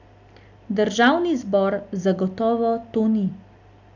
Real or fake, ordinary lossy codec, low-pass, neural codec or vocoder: real; none; 7.2 kHz; none